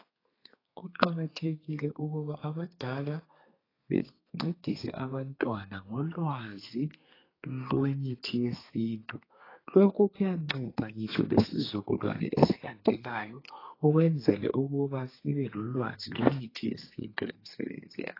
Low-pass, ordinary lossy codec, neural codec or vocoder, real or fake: 5.4 kHz; AAC, 24 kbps; codec, 32 kHz, 1.9 kbps, SNAC; fake